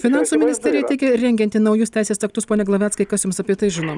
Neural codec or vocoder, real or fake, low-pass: none; real; 10.8 kHz